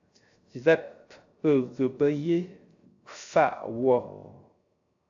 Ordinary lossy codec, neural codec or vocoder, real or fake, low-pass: AAC, 48 kbps; codec, 16 kHz, 0.3 kbps, FocalCodec; fake; 7.2 kHz